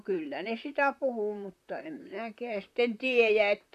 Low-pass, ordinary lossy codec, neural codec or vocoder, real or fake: 14.4 kHz; none; vocoder, 44.1 kHz, 128 mel bands, Pupu-Vocoder; fake